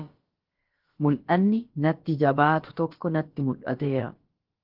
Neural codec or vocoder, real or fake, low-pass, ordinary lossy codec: codec, 16 kHz, about 1 kbps, DyCAST, with the encoder's durations; fake; 5.4 kHz; Opus, 16 kbps